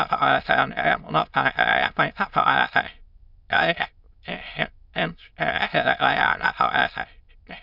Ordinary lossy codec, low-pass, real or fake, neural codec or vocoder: none; 5.4 kHz; fake; autoencoder, 22.05 kHz, a latent of 192 numbers a frame, VITS, trained on many speakers